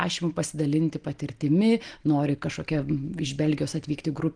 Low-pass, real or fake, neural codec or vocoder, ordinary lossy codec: 9.9 kHz; real; none; Opus, 24 kbps